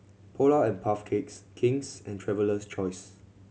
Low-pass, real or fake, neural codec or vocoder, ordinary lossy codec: none; real; none; none